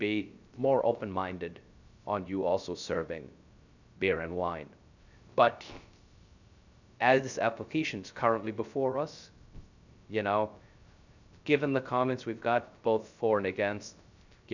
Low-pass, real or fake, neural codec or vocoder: 7.2 kHz; fake; codec, 16 kHz, 0.3 kbps, FocalCodec